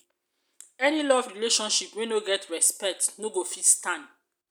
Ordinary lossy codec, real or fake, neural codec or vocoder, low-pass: none; real; none; none